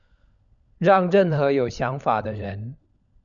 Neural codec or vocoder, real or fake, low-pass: codec, 16 kHz, 16 kbps, FunCodec, trained on LibriTTS, 50 frames a second; fake; 7.2 kHz